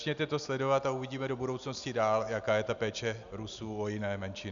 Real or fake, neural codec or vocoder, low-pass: real; none; 7.2 kHz